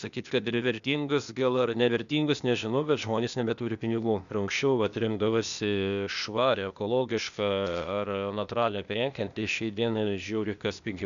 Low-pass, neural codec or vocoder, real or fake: 7.2 kHz; codec, 16 kHz, 0.8 kbps, ZipCodec; fake